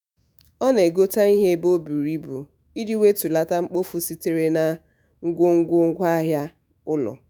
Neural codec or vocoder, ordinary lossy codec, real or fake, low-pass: autoencoder, 48 kHz, 128 numbers a frame, DAC-VAE, trained on Japanese speech; none; fake; none